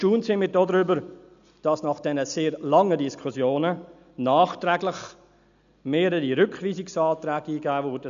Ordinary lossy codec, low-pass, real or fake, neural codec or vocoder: none; 7.2 kHz; real; none